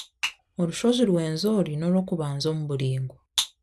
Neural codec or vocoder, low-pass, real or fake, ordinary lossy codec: none; none; real; none